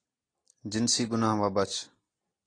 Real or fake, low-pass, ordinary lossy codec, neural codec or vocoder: real; 9.9 kHz; AAC, 32 kbps; none